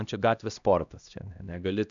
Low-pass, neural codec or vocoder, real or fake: 7.2 kHz; codec, 16 kHz, 1 kbps, X-Codec, HuBERT features, trained on LibriSpeech; fake